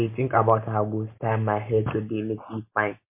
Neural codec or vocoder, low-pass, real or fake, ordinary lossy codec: none; 3.6 kHz; real; MP3, 24 kbps